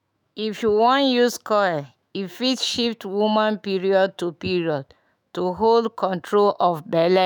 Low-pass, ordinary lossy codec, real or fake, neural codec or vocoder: 19.8 kHz; none; fake; autoencoder, 48 kHz, 128 numbers a frame, DAC-VAE, trained on Japanese speech